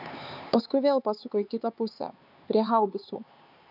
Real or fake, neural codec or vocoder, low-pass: fake; codec, 16 kHz, 4 kbps, X-Codec, HuBERT features, trained on LibriSpeech; 5.4 kHz